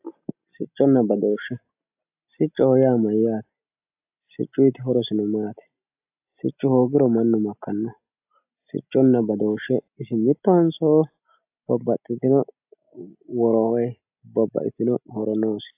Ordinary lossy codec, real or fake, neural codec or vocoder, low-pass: AAC, 32 kbps; real; none; 3.6 kHz